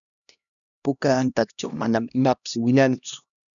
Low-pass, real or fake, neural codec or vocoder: 7.2 kHz; fake; codec, 16 kHz, 1 kbps, X-Codec, HuBERT features, trained on LibriSpeech